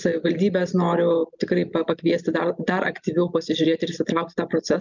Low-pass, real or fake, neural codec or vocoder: 7.2 kHz; real; none